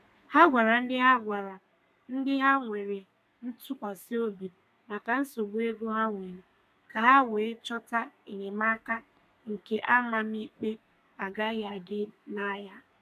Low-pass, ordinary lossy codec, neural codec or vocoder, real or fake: 14.4 kHz; none; codec, 32 kHz, 1.9 kbps, SNAC; fake